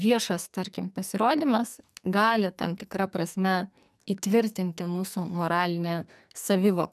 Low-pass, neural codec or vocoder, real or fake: 14.4 kHz; codec, 44.1 kHz, 2.6 kbps, SNAC; fake